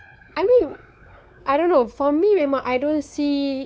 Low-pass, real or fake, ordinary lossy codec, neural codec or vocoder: none; fake; none; codec, 16 kHz, 4 kbps, X-Codec, WavLM features, trained on Multilingual LibriSpeech